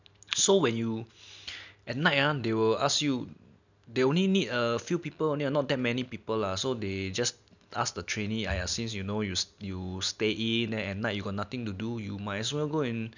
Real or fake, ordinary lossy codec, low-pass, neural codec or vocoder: real; none; 7.2 kHz; none